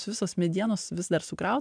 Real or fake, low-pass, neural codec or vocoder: real; 9.9 kHz; none